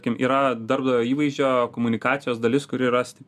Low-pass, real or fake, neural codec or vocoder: 14.4 kHz; real; none